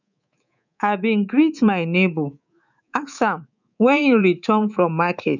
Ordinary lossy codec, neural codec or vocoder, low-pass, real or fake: none; codec, 24 kHz, 3.1 kbps, DualCodec; 7.2 kHz; fake